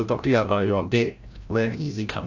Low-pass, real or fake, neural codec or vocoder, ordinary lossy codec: 7.2 kHz; fake; codec, 16 kHz, 0.5 kbps, FreqCodec, larger model; AAC, 48 kbps